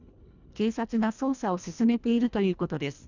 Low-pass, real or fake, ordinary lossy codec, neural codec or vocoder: 7.2 kHz; fake; none; codec, 24 kHz, 1.5 kbps, HILCodec